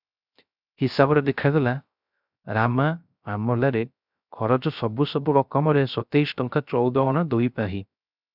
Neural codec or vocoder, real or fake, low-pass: codec, 16 kHz, 0.3 kbps, FocalCodec; fake; 5.4 kHz